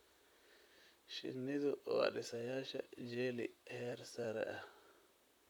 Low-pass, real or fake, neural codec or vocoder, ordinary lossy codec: none; fake; vocoder, 44.1 kHz, 128 mel bands, Pupu-Vocoder; none